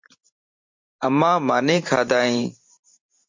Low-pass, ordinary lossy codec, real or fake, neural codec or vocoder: 7.2 kHz; MP3, 48 kbps; fake; vocoder, 44.1 kHz, 128 mel bands every 512 samples, BigVGAN v2